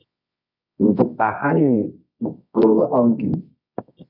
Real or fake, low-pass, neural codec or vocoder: fake; 5.4 kHz; codec, 24 kHz, 0.9 kbps, WavTokenizer, medium music audio release